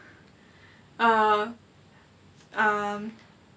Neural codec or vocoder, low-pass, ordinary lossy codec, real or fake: none; none; none; real